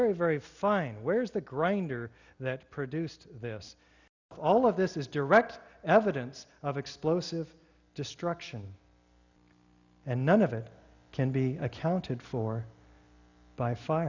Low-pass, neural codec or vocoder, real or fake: 7.2 kHz; none; real